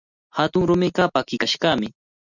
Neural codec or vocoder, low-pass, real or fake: none; 7.2 kHz; real